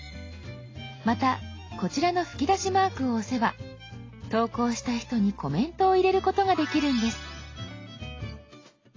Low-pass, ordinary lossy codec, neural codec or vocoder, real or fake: 7.2 kHz; AAC, 32 kbps; none; real